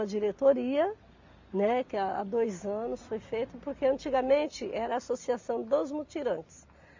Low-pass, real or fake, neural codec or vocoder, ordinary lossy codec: 7.2 kHz; real; none; MP3, 48 kbps